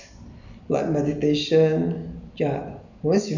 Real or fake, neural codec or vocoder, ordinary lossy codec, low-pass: real; none; none; 7.2 kHz